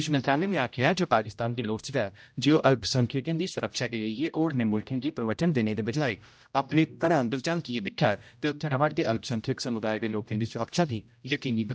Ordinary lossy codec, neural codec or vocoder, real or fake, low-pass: none; codec, 16 kHz, 0.5 kbps, X-Codec, HuBERT features, trained on general audio; fake; none